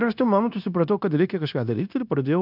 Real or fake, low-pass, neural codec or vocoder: fake; 5.4 kHz; codec, 16 kHz, 0.9 kbps, LongCat-Audio-Codec